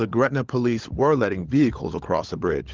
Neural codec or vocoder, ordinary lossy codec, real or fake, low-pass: codec, 16 kHz, 16 kbps, FunCodec, trained on LibriTTS, 50 frames a second; Opus, 16 kbps; fake; 7.2 kHz